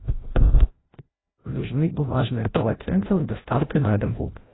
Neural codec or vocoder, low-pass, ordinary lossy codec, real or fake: codec, 16 kHz, 0.5 kbps, FreqCodec, larger model; 7.2 kHz; AAC, 16 kbps; fake